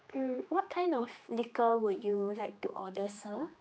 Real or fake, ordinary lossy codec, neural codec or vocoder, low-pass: fake; none; codec, 16 kHz, 2 kbps, X-Codec, HuBERT features, trained on general audio; none